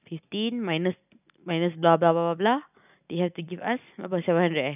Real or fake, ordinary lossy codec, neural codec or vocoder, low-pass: real; none; none; 3.6 kHz